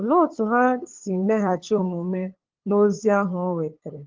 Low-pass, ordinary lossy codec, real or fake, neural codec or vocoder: 7.2 kHz; Opus, 16 kbps; fake; codec, 16 kHz, 8 kbps, FunCodec, trained on LibriTTS, 25 frames a second